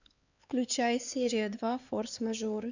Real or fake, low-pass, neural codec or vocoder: fake; 7.2 kHz; codec, 16 kHz, 4 kbps, X-Codec, HuBERT features, trained on LibriSpeech